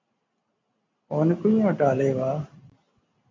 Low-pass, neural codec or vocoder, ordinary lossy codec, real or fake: 7.2 kHz; none; MP3, 48 kbps; real